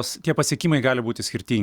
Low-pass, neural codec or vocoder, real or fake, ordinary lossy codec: 19.8 kHz; none; real; Opus, 64 kbps